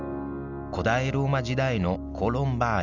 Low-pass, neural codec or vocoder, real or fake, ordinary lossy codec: 7.2 kHz; none; real; none